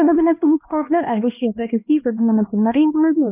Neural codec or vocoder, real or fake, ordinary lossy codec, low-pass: codec, 16 kHz, 1 kbps, X-Codec, HuBERT features, trained on LibriSpeech; fake; none; 3.6 kHz